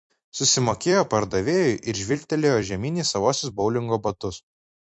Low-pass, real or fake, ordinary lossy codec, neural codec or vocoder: 10.8 kHz; fake; MP3, 48 kbps; vocoder, 44.1 kHz, 128 mel bands every 256 samples, BigVGAN v2